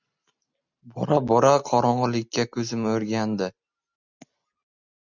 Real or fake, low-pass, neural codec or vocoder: real; 7.2 kHz; none